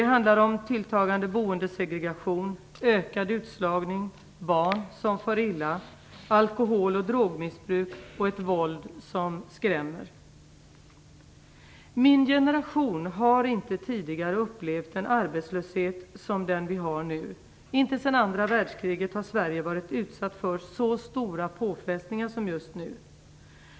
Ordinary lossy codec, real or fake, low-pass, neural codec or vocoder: none; real; none; none